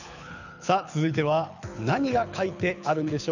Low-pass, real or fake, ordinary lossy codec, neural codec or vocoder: 7.2 kHz; fake; none; codec, 24 kHz, 6 kbps, HILCodec